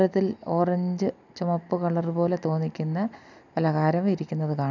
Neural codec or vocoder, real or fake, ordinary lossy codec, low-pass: none; real; none; 7.2 kHz